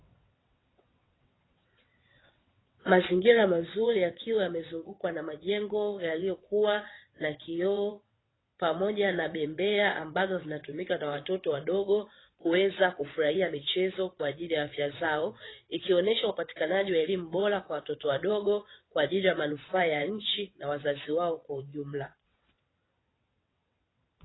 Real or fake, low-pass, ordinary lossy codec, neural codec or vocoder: fake; 7.2 kHz; AAC, 16 kbps; vocoder, 22.05 kHz, 80 mel bands, WaveNeXt